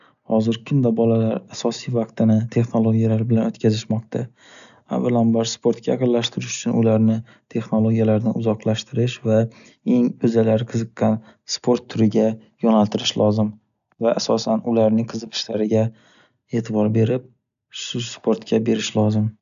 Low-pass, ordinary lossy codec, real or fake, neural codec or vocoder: 7.2 kHz; none; real; none